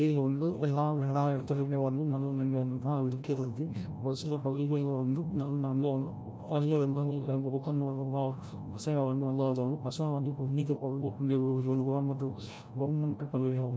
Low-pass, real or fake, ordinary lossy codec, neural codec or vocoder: none; fake; none; codec, 16 kHz, 0.5 kbps, FreqCodec, larger model